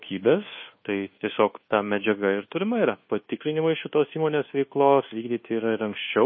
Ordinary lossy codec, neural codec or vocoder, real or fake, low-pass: MP3, 24 kbps; codec, 24 kHz, 1.2 kbps, DualCodec; fake; 7.2 kHz